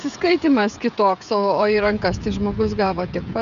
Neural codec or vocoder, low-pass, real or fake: codec, 16 kHz, 16 kbps, FreqCodec, smaller model; 7.2 kHz; fake